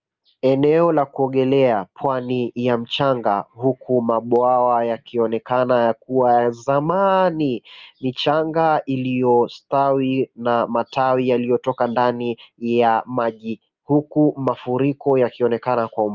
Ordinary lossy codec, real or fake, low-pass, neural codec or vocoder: Opus, 24 kbps; real; 7.2 kHz; none